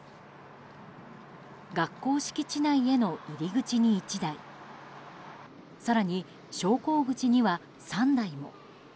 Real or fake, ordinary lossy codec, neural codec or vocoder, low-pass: real; none; none; none